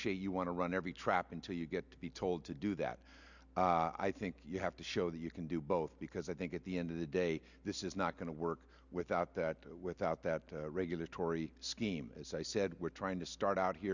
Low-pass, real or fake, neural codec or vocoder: 7.2 kHz; real; none